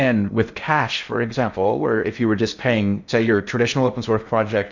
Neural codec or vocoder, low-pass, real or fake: codec, 16 kHz in and 24 kHz out, 0.6 kbps, FocalCodec, streaming, 4096 codes; 7.2 kHz; fake